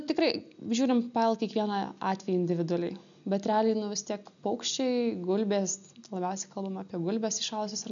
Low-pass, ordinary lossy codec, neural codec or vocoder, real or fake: 7.2 kHz; MP3, 96 kbps; none; real